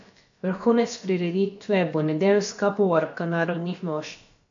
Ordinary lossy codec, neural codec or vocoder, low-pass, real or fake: AAC, 64 kbps; codec, 16 kHz, about 1 kbps, DyCAST, with the encoder's durations; 7.2 kHz; fake